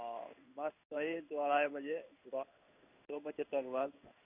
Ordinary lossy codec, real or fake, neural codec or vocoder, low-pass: none; fake; codec, 16 kHz in and 24 kHz out, 1 kbps, XY-Tokenizer; 3.6 kHz